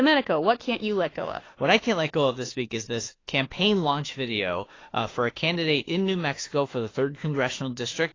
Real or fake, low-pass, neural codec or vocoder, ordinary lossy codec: fake; 7.2 kHz; autoencoder, 48 kHz, 32 numbers a frame, DAC-VAE, trained on Japanese speech; AAC, 32 kbps